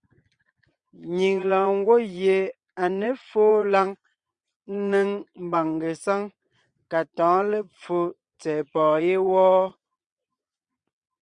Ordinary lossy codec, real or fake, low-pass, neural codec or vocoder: Opus, 64 kbps; fake; 9.9 kHz; vocoder, 22.05 kHz, 80 mel bands, Vocos